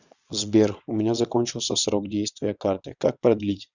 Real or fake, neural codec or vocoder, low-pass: fake; vocoder, 22.05 kHz, 80 mel bands, WaveNeXt; 7.2 kHz